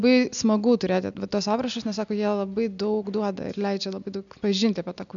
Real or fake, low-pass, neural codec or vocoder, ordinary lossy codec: real; 7.2 kHz; none; MP3, 96 kbps